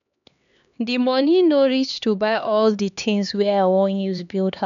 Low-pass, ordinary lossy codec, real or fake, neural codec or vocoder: 7.2 kHz; none; fake; codec, 16 kHz, 2 kbps, X-Codec, HuBERT features, trained on LibriSpeech